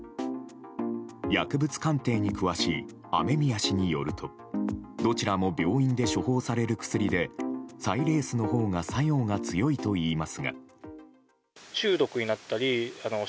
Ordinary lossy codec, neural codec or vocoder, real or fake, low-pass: none; none; real; none